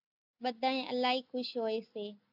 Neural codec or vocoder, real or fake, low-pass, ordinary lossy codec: none; real; 5.4 kHz; AAC, 48 kbps